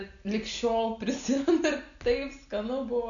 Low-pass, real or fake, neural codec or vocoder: 7.2 kHz; real; none